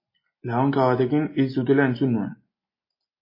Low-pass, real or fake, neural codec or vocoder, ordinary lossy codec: 5.4 kHz; real; none; MP3, 24 kbps